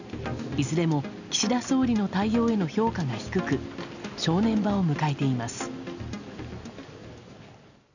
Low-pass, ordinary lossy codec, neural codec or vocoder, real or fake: 7.2 kHz; none; none; real